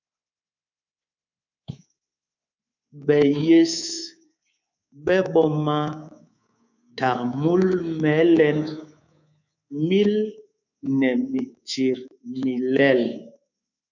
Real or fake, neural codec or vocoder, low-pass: fake; codec, 24 kHz, 3.1 kbps, DualCodec; 7.2 kHz